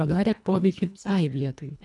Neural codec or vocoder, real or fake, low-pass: codec, 24 kHz, 1.5 kbps, HILCodec; fake; 10.8 kHz